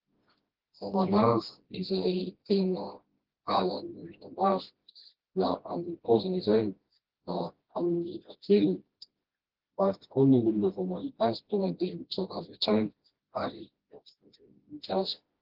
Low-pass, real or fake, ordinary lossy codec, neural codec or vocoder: 5.4 kHz; fake; Opus, 16 kbps; codec, 16 kHz, 1 kbps, FreqCodec, smaller model